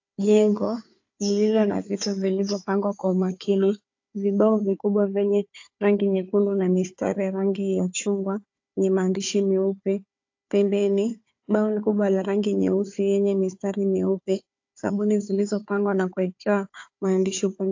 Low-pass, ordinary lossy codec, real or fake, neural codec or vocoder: 7.2 kHz; AAC, 48 kbps; fake; codec, 16 kHz, 4 kbps, FunCodec, trained on Chinese and English, 50 frames a second